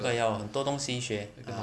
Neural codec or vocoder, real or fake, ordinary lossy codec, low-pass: none; real; none; none